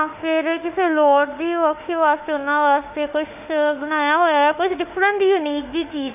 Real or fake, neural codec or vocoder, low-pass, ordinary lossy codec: fake; autoencoder, 48 kHz, 32 numbers a frame, DAC-VAE, trained on Japanese speech; 3.6 kHz; none